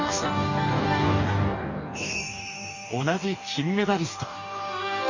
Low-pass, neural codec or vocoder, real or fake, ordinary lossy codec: 7.2 kHz; codec, 44.1 kHz, 2.6 kbps, DAC; fake; none